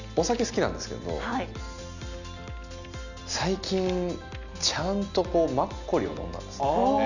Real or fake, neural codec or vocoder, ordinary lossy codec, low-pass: real; none; none; 7.2 kHz